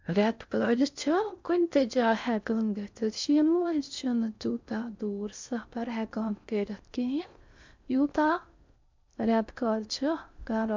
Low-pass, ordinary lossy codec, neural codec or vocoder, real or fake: 7.2 kHz; MP3, 64 kbps; codec, 16 kHz in and 24 kHz out, 0.6 kbps, FocalCodec, streaming, 4096 codes; fake